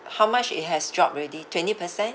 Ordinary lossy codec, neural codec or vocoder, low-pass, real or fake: none; none; none; real